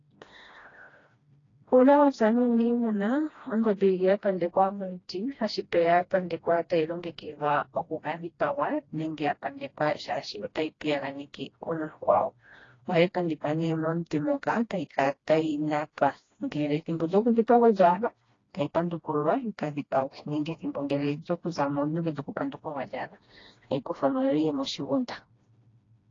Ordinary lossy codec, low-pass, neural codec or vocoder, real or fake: AAC, 32 kbps; 7.2 kHz; codec, 16 kHz, 1 kbps, FreqCodec, smaller model; fake